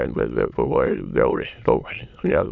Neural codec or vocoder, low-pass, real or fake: autoencoder, 22.05 kHz, a latent of 192 numbers a frame, VITS, trained on many speakers; 7.2 kHz; fake